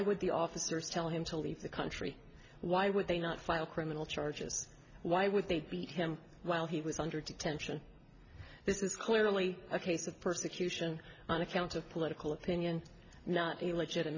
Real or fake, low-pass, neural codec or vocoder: real; 7.2 kHz; none